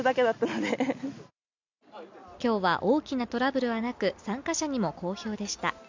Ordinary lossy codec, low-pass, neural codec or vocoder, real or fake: MP3, 64 kbps; 7.2 kHz; none; real